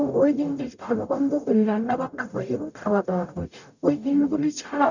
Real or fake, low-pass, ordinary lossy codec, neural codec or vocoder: fake; 7.2 kHz; none; codec, 44.1 kHz, 0.9 kbps, DAC